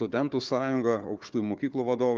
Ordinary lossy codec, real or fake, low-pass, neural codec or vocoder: Opus, 32 kbps; fake; 7.2 kHz; codec, 16 kHz, 4 kbps, FunCodec, trained on Chinese and English, 50 frames a second